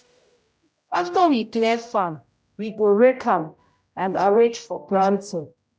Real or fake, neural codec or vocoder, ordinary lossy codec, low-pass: fake; codec, 16 kHz, 0.5 kbps, X-Codec, HuBERT features, trained on general audio; none; none